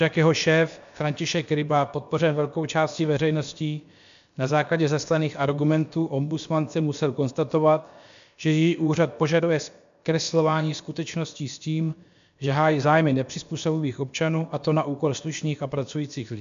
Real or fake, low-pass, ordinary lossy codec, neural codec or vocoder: fake; 7.2 kHz; MP3, 64 kbps; codec, 16 kHz, about 1 kbps, DyCAST, with the encoder's durations